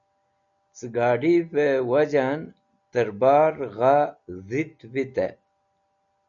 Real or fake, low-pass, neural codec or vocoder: real; 7.2 kHz; none